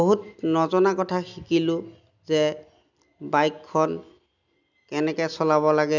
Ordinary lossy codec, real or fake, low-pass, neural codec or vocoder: none; real; 7.2 kHz; none